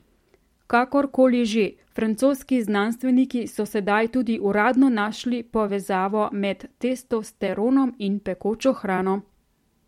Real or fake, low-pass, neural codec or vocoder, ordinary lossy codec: fake; 19.8 kHz; vocoder, 44.1 kHz, 128 mel bands every 256 samples, BigVGAN v2; MP3, 64 kbps